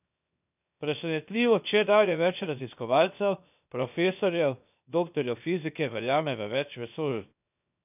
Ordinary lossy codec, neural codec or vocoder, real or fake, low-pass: none; codec, 16 kHz, 0.7 kbps, FocalCodec; fake; 3.6 kHz